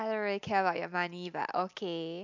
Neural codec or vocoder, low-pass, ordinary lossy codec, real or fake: none; 7.2 kHz; MP3, 64 kbps; real